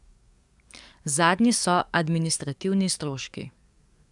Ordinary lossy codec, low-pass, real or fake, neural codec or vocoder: none; 10.8 kHz; fake; codec, 44.1 kHz, 7.8 kbps, DAC